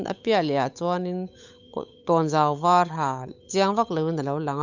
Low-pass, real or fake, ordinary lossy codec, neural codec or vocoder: 7.2 kHz; fake; none; codec, 16 kHz, 8 kbps, FunCodec, trained on Chinese and English, 25 frames a second